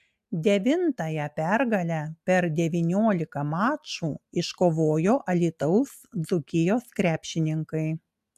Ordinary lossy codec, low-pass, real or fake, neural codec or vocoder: AAC, 96 kbps; 14.4 kHz; real; none